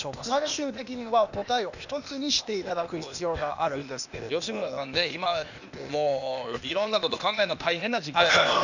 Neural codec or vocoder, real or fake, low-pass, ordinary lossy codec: codec, 16 kHz, 0.8 kbps, ZipCodec; fake; 7.2 kHz; none